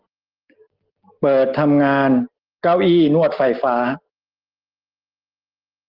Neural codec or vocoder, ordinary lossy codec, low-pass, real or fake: none; Opus, 16 kbps; 5.4 kHz; real